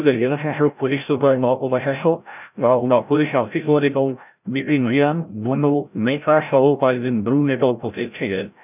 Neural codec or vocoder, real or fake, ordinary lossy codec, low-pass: codec, 16 kHz, 0.5 kbps, FreqCodec, larger model; fake; none; 3.6 kHz